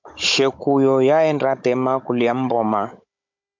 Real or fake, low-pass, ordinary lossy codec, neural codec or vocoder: fake; 7.2 kHz; MP3, 64 kbps; codec, 16 kHz, 16 kbps, FunCodec, trained on Chinese and English, 50 frames a second